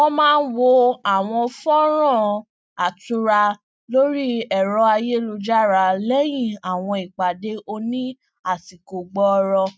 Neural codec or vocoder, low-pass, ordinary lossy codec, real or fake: none; none; none; real